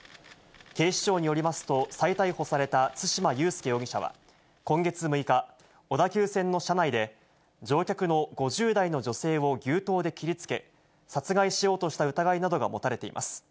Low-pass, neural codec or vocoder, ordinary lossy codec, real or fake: none; none; none; real